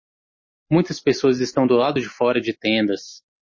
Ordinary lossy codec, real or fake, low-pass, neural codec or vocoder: MP3, 32 kbps; real; 7.2 kHz; none